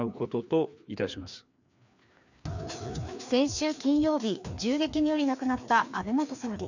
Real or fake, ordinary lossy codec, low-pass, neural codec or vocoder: fake; none; 7.2 kHz; codec, 16 kHz, 2 kbps, FreqCodec, larger model